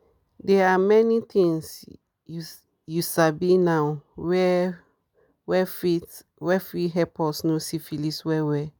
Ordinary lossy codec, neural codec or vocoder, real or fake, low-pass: none; none; real; none